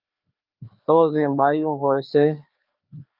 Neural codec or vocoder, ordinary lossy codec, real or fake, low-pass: codec, 16 kHz, 2 kbps, FreqCodec, larger model; Opus, 24 kbps; fake; 5.4 kHz